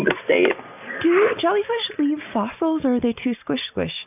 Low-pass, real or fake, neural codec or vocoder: 3.6 kHz; real; none